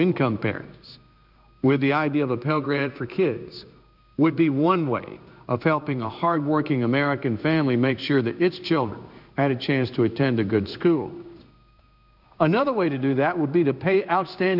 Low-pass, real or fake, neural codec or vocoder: 5.4 kHz; fake; codec, 16 kHz in and 24 kHz out, 1 kbps, XY-Tokenizer